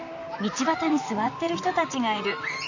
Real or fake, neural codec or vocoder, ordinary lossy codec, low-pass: fake; vocoder, 44.1 kHz, 128 mel bands, Pupu-Vocoder; none; 7.2 kHz